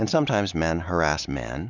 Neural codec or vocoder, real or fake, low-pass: none; real; 7.2 kHz